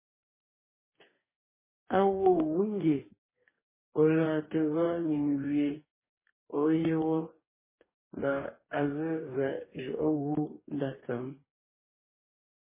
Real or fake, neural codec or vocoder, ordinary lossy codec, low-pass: fake; codec, 44.1 kHz, 2.6 kbps, DAC; MP3, 16 kbps; 3.6 kHz